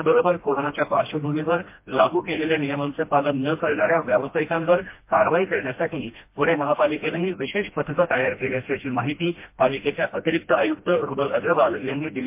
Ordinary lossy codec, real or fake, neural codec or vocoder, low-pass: MP3, 24 kbps; fake; codec, 16 kHz, 1 kbps, FreqCodec, smaller model; 3.6 kHz